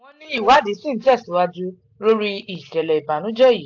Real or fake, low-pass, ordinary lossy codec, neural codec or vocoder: real; 7.2 kHz; AAC, 48 kbps; none